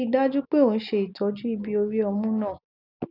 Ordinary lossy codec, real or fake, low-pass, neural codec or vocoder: none; real; 5.4 kHz; none